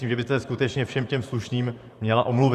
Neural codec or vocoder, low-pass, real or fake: vocoder, 44.1 kHz, 128 mel bands, Pupu-Vocoder; 14.4 kHz; fake